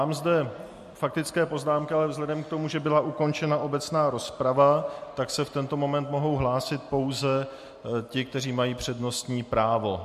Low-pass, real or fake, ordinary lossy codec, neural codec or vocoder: 14.4 kHz; real; MP3, 64 kbps; none